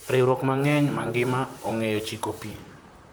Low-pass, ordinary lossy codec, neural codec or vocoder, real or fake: none; none; vocoder, 44.1 kHz, 128 mel bands, Pupu-Vocoder; fake